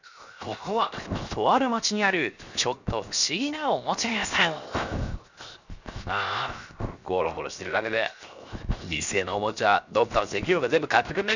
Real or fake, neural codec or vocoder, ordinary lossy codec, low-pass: fake; codec, 16 kHz, 0.7 kbps, FocalCodec; none; 7.2 kHz